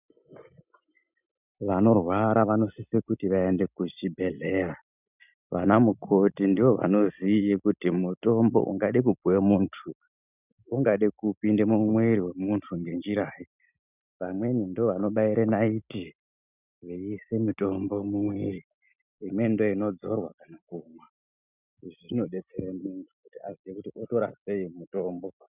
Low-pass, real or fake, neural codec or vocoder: 3.6 kHz; real; none